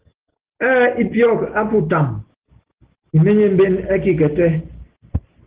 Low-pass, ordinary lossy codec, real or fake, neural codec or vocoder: 3.6 kHz; Opus, 16 kbps; real; none